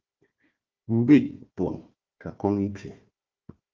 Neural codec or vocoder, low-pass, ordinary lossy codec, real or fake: codec, 16 kHz, 1 kbps, FunCodec, trained on Chinese and English, 50 frames a second; 7.2 kHz; Opus, 24 kbps; fake